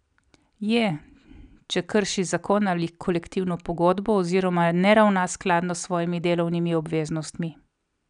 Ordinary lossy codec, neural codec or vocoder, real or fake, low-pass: none; none; real; 9.9 kHz